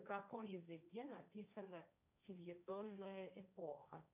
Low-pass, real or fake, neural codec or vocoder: 3.6 kHz; fake; codec, 16 kHz, 1.1 kbps, Voila-Tokenizer